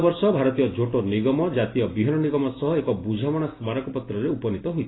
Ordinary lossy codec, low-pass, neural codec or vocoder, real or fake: AAC, 16 kbps; 7.2 kHz; none; real